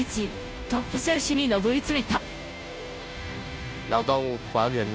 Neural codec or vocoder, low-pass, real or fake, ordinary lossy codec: codec, 16 kHz, 0.5 kbps, FunCodec, trained on Chinese and English, 25 frames a second; none; fake; none